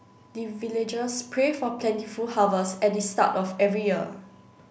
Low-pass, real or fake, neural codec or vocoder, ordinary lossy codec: none; real; none; none